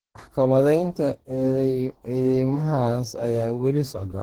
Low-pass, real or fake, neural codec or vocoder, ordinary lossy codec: 19.8 kHz; fake; codec, 44.1 kHz, 2.6 kbps, DAC; Opus, 16 kbps